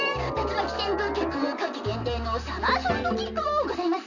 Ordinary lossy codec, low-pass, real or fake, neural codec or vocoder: none; 7.2 kHz; real; none